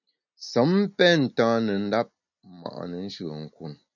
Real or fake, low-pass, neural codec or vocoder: real; 7.2 kHz; none